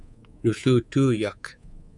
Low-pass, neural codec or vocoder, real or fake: 10.8 kHz; codec, 24 kHz, 3.1 kbps, DualCodec; fake